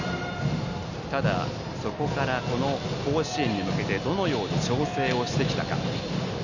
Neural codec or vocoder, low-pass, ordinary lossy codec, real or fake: none; 7.2 kHz; none; real